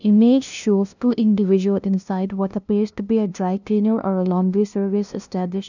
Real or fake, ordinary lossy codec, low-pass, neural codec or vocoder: fake; none; 7.2 kHz; codec, 16 kHz, 0.5 kbps, FunCodec, trained on LibriTTS, 25 frames a second